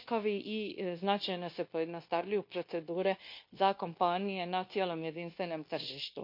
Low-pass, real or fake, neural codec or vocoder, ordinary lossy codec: 5.4 kHz; fake; codec, 16 kHz, 0.9 kbps, LongCat-Audio-Codec; MP3, 32 kbps